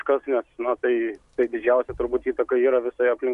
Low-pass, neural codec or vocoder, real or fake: 10.8 kHz; none; real